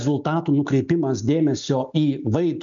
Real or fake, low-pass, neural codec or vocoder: real; 7.2 kHz; none